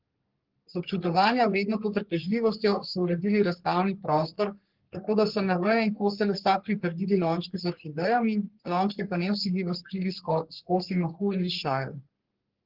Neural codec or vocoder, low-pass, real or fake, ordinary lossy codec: codec, 44.1 kHz, 3.4 kbps, Pupu-Codec; 5.4 kHz; fake; Opus, 16 kbps